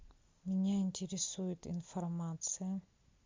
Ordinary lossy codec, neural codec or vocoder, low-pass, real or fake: MP3, 64 kbps; none; 7.2 kHz; real